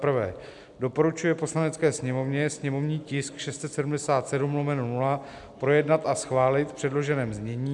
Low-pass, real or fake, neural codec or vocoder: 10.8 kHz; real; none